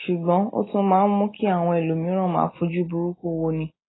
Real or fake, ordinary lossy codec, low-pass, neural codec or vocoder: real; AAC, 16 kbps; 7.2 kHz; none